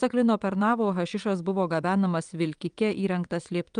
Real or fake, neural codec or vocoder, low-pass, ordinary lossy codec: fake; vocoder, 22.05 kHz, 80 mel bands, WaveNeXt; 9.9 kHz; Opus, 32 kbps